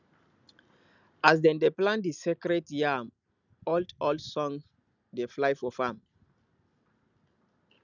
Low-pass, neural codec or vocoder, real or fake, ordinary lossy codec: 7.2 kHz; none; real; none